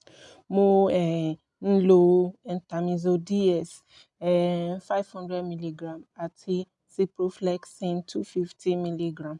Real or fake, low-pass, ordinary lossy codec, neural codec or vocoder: real; 10.8 kHz; none; none